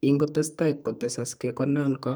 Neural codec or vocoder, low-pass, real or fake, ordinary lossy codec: codec, 44.1 kHz, 2.6 kbps, SNAC; none; fake; none